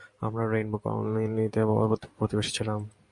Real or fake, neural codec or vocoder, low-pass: fake; vocoder, 44.1 kHz, 128 mel bands every 512 samples, BigVGAN v2; 10.8 kHz